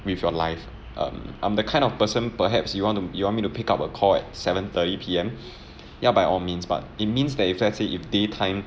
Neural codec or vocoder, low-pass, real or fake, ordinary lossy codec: none; none; real; none